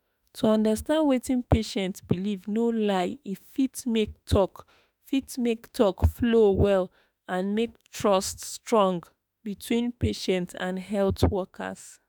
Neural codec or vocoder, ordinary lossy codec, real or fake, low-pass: autoencoder, 48 kHz, 32 numbers a frame, DAC-VAE, trained on Japanese speech; none; fake; none